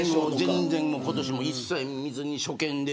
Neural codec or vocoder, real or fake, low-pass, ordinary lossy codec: none; real; none; none